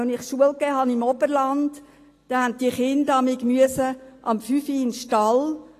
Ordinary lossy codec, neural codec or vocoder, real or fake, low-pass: AAC, 48 kbps; none; real; 14.4 kHz